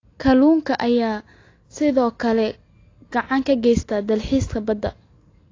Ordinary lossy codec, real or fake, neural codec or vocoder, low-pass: AAC, 32 kbps; real; none; 7.2 kHz